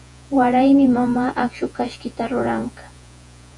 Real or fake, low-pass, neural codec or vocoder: fake; 10.8 kHz; vocoder, 48 kHz, 128 mel bands, Vocos